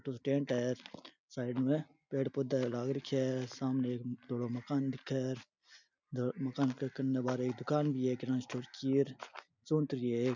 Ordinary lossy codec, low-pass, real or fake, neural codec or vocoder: none; 7.2 kHz; real; none